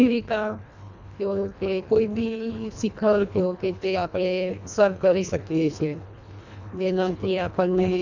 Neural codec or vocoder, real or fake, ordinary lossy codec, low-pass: codec, 24 kHz, 1.5 kbps, HILCodec; fake; none; 7.2 kHz